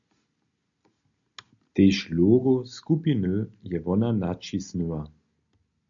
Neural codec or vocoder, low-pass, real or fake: none; 7.2 kHz; real